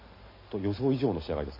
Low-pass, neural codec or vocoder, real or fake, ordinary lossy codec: 5.4 kHz; none; real; MP3, 24 kbps